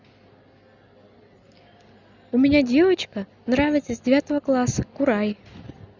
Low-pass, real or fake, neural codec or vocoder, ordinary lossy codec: 7.2 kHz; real; none; none